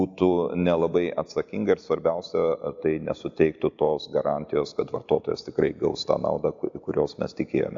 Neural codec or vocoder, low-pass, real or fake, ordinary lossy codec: none; 7.2 kHz; real; AAC, 64 kbps